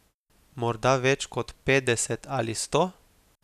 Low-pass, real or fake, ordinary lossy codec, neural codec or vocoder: 14.4 kHz; real; none; none